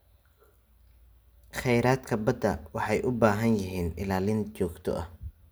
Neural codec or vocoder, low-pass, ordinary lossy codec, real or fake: none; none; none; real